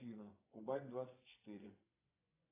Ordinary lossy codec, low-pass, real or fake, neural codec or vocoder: AAC, 16 kbps; 3.6 kHz; fake; vocoder, 24 kHz, 100 mel bands, Vocos